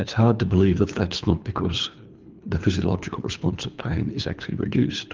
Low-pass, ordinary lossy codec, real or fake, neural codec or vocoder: 7.2 kHz; Opus, 24 kbps; fake; codec, 24 kHz, 3 kbps, HILCodec